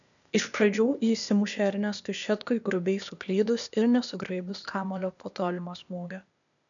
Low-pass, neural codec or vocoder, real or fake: 7.2 kHz; codec, 16 kHz, 0.8 kbps, ZipCodec; fake